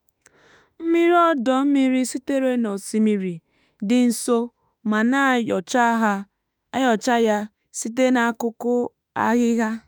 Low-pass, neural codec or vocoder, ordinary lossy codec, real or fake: none; autoencoder, 48 kHz, 32 numbers a frame, DAC-VAE, trained on Japanese speech; none; fake